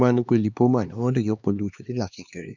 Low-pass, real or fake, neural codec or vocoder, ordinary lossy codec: 7.2 kHz; fake; codec, 16 kHz, 2 kbps, X-Codec, HuBERT features, trained on LibriSpeech; none